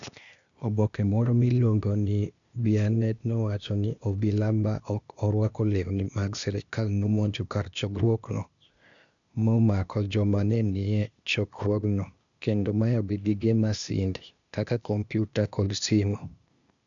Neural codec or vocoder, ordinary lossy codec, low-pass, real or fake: codec, 16 kHz, 0.8 kbps, ZipCodec; none; 7.2 kHz; fake